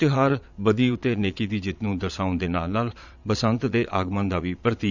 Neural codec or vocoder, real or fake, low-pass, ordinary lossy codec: vocoder, 22.05 kHz, 80 mel bands, Vocos; fake; 7.2 kHz; none